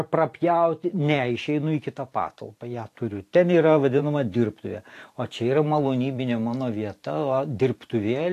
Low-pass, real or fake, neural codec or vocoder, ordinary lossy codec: 14.4 kHz; fake; vocoder, 44.1 kHz, 128 mel bands every 512 samples, BigVGAN v2; AAC, 64 kbps